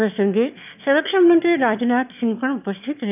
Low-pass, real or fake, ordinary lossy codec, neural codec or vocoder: 3.6 kHz; fake; none; autoencoder, 22.05 kHz, a latent of 192 numbers a frame, VITS, trained on one speaker